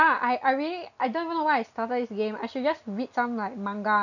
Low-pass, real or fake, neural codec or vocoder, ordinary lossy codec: 7.2 kHz; real; none; none